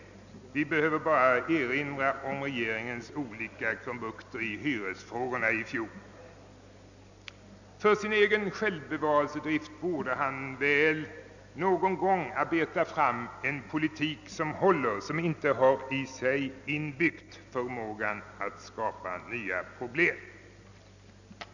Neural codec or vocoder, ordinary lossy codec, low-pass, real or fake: none; none; 7.2 kHz; real